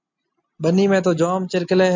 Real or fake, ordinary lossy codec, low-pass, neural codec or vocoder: real; MP3, 64 kbps; 7.2 kHz; none